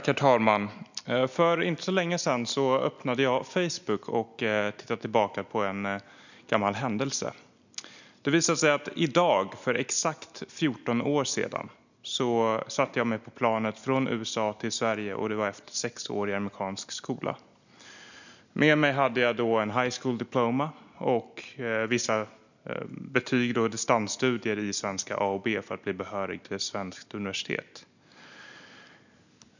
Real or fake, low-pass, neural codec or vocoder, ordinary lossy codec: real; 7.2 kHz; none; none